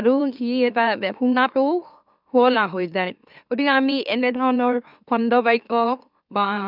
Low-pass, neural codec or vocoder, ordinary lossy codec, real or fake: 5.4 kHz; autoencoder, 44.1 kHz, a latent of 192 numbers a frame, MeloTTS; none; fake